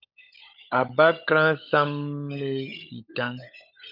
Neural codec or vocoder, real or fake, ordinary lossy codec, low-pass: codec, 16 kHz, 16 kbps, FunCodec, trained on LibriTTS, 50 frames a second; fake; MP3, 48 kbps; 5.4 kHz